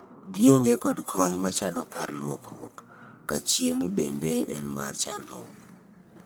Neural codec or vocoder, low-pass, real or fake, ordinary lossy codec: codec, 44.1 kHz, 1.7 kbps, Pupu-Codec; none; fake; none